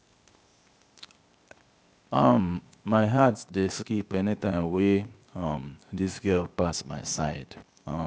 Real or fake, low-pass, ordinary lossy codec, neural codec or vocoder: fake; none; none; codec, 16 kHz, 0.8 kbps, ZipCodec